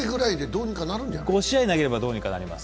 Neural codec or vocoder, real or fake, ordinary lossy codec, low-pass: none; real; none; none